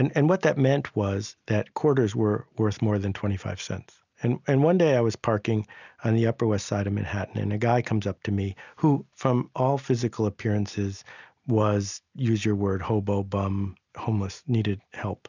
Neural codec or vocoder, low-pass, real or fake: none; 7.2 kHz; real